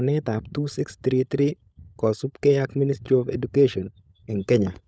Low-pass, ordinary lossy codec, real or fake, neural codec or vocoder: none; none; fake; codec, 16 kHz, 16 kbps, FunCodec, trained on LibriTTS, 50 frames a second